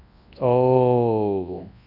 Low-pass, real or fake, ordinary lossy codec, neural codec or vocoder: 5.4 kHz; fake; none; codec, 24 kHz, 0.9 kbps, WavTokenizer, large speech release